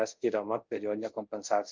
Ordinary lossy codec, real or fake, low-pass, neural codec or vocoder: Opus, 24 kbps; fake; 7.2 kHz; codec, 24 kHz, 0.5 kbps, DualCodec